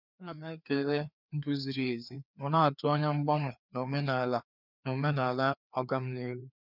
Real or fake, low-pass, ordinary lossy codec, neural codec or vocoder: fake; 5.4 kHz; none; codec, 16 kHz, 2 kbps, FreqCodec, larger model